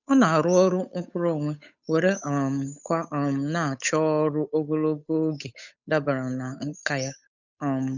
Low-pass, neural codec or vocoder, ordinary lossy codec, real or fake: 7.2 kHz; codec, 16 kHz, 8 kbps, FunCodec, trained on Chinese and English, 25 frames a second; none; fake